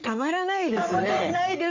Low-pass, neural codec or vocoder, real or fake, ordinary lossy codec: 7.2 kHz; codec, 44.1 kHz, 3.4 kbps, Pupu-Codec; fake; none